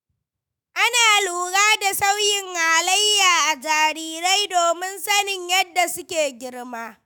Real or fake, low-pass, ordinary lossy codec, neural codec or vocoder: fake; none; none; autoencoder, 48 kHz, 128 numbers a frame, DAC-VAE, trained on Japanese speech